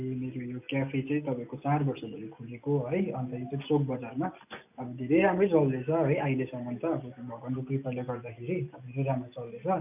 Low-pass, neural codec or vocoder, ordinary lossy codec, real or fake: 3.6 kHz; none; AAC, 32 kbps; real